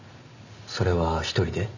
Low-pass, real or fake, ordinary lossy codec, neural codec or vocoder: 7.2 kHz; real; none; none